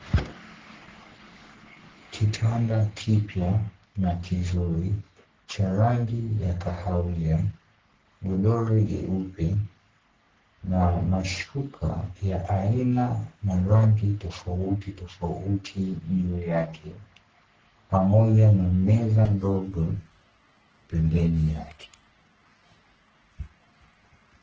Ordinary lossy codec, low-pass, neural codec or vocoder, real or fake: Opus, 16 kbps; 7.2 kHz; codec, 44.1 kHz, 3.4 kbps, Pupu-Codec; fake